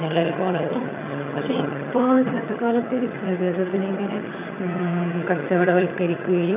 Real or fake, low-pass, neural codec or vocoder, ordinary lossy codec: fake; 3.6 kHz; vocoder, 22.05 kHz, 80 mel bands, HiFi-GAN; none